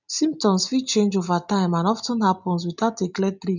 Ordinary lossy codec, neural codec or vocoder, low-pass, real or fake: none; none; 7.2 kHz; real